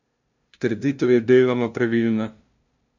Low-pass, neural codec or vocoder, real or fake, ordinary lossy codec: 7.2 kHz; codec, 16 kHz, 0.5 kbps, FunCodec, trained on LibriTTS, 25 frames a second; fake; none